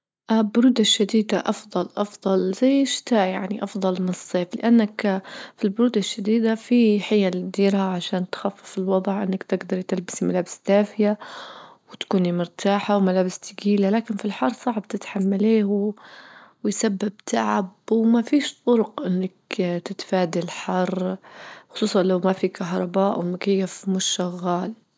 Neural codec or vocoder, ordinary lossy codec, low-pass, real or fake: none; none; none; real